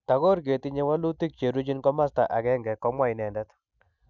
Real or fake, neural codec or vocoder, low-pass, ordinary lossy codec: real; none; 7.2 kHz; none